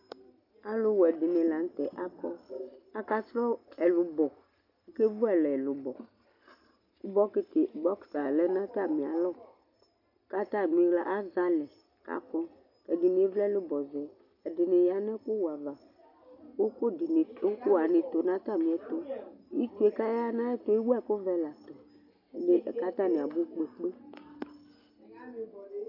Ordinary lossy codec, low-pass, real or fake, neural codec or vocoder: MP3, 48 kbps; 5.4 kHz; real; none